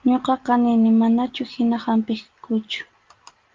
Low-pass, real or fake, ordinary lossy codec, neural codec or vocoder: 7.2 kHz; real; Opus, 32 kbps; none